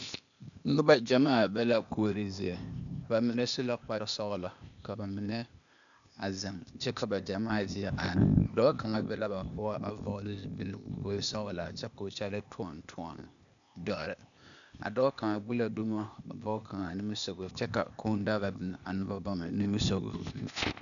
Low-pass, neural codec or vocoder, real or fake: 7.2 kHz; codec, 16 kHz, 0.8 kbps, ZipCodec; fake